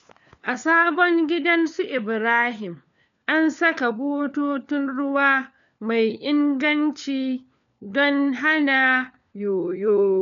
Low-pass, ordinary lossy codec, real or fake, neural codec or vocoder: 7.2 kHz; none; fake; codec, 16 kHz, 4 kbps, FunCodec, trained on LibriTTS, 50 frames a second